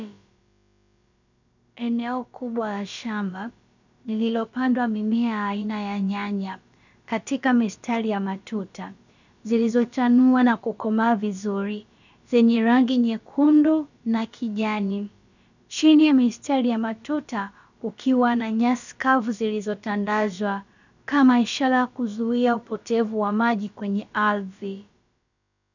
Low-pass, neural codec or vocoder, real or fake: 7.2 kHz; codec, 16 kHz, about 1 kbps, DyCAST, with the encoder's durations; fake